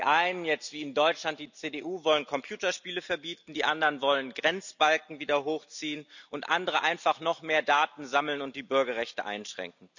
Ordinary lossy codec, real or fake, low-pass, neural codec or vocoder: none; real; 7.2 kHz; none